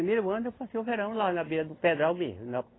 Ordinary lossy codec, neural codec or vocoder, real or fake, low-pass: AAC, 16 kbps; none; real; 7.2 kHz